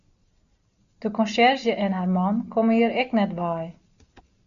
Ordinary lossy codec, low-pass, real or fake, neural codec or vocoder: MP3, 96 kbps; 7.2 kHz; real; none